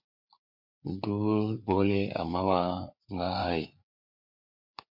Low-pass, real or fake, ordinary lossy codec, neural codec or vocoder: 5.4 kHz; fake; MP3, 32 kbps; codec, 16 kHz, 4 kbps, FreqCodec, larger model